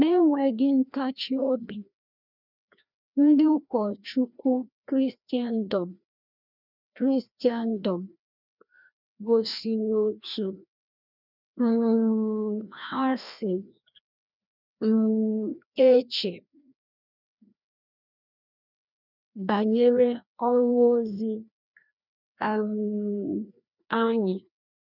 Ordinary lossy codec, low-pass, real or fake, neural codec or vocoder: none; 5.4 kHz; fake; codec, 16 kHz, 1 kbps, FreqCodec, larger model